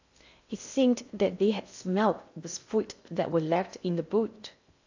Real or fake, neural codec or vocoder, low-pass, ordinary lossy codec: fake; codec, 16 kHz in and 24 kHz out, 0.6 kbps, FocalCodec, streaming, 2048 codes; 7.2 kHz; AAC, 48 kbps